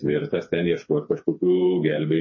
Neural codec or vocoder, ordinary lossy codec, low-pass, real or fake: codec, 16 kHz, 4 kbps, FreqCodec, smaller model; MP3, 32 kbps; 7.2 kHz; fake